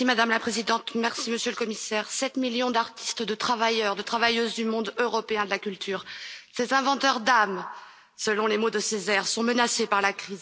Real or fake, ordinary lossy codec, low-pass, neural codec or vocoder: real; none; none; none